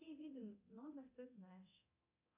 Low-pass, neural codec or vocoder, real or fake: 3.6 kHz; codec, 24 kHz, 0.9 kbps, DualCodec; fake